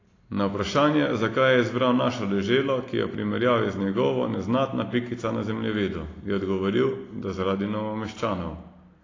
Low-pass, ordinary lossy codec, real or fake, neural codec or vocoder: 7.2 kHz; AAC, 32 kbps; real; none